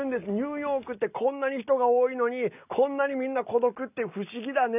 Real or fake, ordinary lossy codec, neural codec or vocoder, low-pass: real; none; none; 3.6 kHz